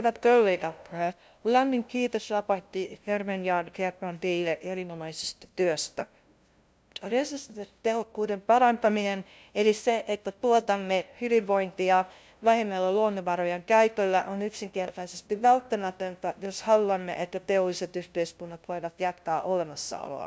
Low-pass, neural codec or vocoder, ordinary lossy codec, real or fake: none; codec, 16 kHz, 0.5 kbps, FunCodec, trained on LibriTTS, 25 frames a second; none; fake